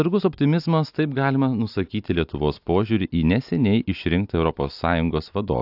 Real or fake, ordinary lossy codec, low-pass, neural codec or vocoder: real; AAC, 48 kbps; 5.4 kHz; none